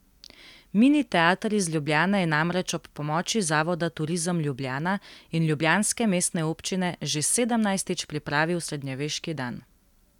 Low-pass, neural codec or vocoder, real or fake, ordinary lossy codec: 19.8 kHz; none; real; none